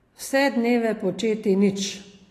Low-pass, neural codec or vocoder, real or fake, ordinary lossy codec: 14.4 kHz; none; real; AAC, 48 kbps